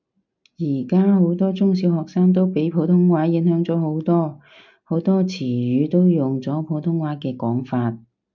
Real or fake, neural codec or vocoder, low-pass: real; none; 7.2 kHz